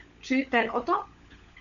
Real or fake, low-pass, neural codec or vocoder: fake; 7.2 kHz; codec, 16 kHz, 16 kbps, FunCodec, trained on LibriTTS, 50 frames a second